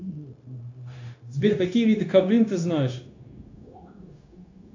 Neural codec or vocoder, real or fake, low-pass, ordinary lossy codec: codec, 16 kHz, 0.9 kbps, LongCat-Audio-Codec; fake; 7.2 kHz; AAC, 48 kbps